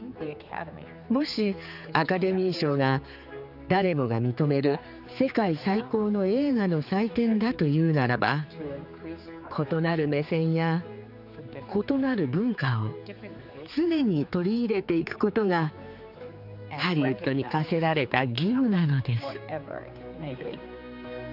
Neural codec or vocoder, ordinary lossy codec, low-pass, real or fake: codec, 16 kHz, 4 kbps, X-Codec, HuBERT features, trained on general audio; none; 5.4 kHz; fake